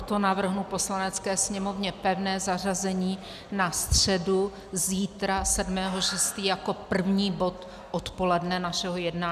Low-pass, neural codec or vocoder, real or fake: 14.4 kHz; none; real